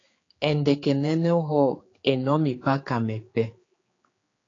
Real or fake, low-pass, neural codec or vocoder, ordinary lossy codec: fake; 7.2 kHz; codec, 16 kHz, 4 kbps, X-Codec, HuBERT features, trained on general audio; AAC, 32 kbps